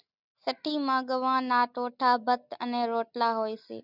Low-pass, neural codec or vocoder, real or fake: 5.4 kHz; none; real